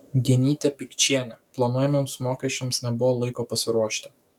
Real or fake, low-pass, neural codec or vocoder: fake; 19.8 kHz; codec, 44.1 kHz, 7.8 kbps, DAC